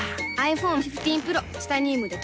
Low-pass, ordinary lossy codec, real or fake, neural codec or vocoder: none; none; real; none